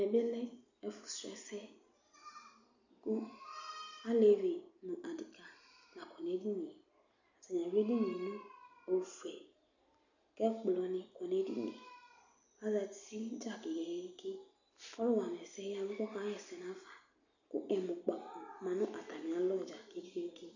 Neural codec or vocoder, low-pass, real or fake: none; 7.2 kHz; real